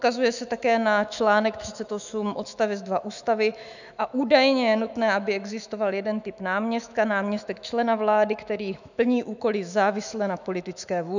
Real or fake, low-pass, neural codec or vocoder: fake; 7.2 kHz; autoencoder, 48 kHz, 128 numbers a frame, DAC-VAE, trained on Japanese speech